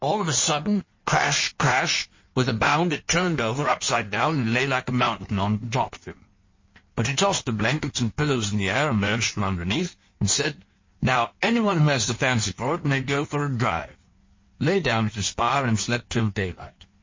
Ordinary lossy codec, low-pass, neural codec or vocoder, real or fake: MP3, 32 kbps; 7.2 kHz; codec, 16 kHz in and 24 kHz out, 1.1 kbps, FireRedTTS-2 codec; fake